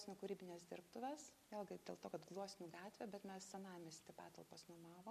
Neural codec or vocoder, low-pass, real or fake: none; 14.4 kHz; real